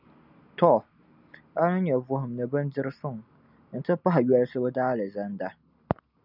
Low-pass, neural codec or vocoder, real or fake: 5.4 kHz; none; real